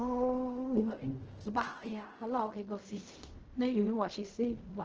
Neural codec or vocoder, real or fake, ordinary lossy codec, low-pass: codec, 16 kHz in and 24 kHz out, 0.4 kbps, LongCat-Audio-Codec, fine tuned four codebook decoder; fake; Opus, 16 kbps; 7.2 kHz